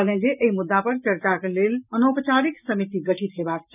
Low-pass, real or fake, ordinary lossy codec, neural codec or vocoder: 3.6 kHz; real; none; none